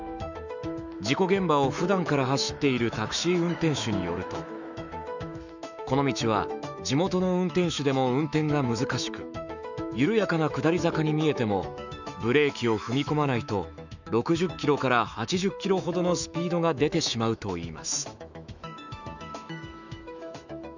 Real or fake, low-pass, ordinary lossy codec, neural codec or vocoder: fake; 7.2 kHz; none; autoencoder, 48 kHz, 128 numbers a frame, DAC-VAE, trained on Japanese speech